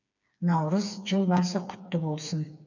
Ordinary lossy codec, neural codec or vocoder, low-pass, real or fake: none; codec, 16 kHz, 4 kbps, FreqCodec, smaller model; 7.2 kHz; fake